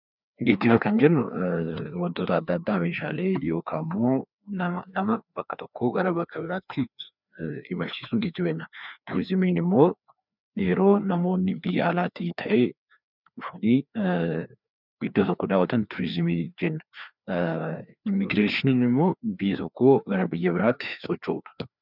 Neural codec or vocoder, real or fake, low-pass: codec, 16 kHz, 2 kbps, FreqCodec, larger model; fake; 5.4 kHz